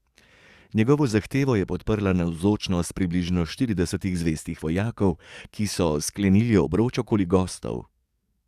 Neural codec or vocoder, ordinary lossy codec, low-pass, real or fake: codec, 44.1 kHz, 7.8 kbps, DAC; Opus, 64 kbps; 14.4 kHz; fake